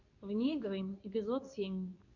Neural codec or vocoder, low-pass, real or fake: codec, 24 kHz, 0.9 kbps, WavTokenizer, medium speech release version 2; 7.2 kHz; fake